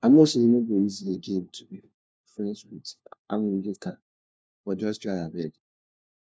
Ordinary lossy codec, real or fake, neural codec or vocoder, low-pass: none; fake; codec, 16 kHz, 1 kbps, FunCodec, trained on LibriTTS, 50 frames a second; none